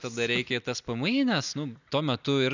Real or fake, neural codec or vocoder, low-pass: real; none; 7.2 kHz